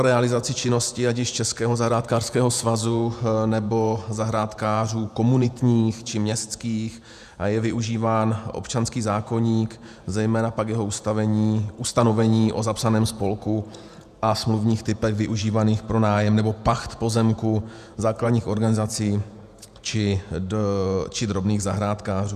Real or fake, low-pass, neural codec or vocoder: fake; 14.4 kHz; vocoder, 48 kHz, 128 mel bands, Vocos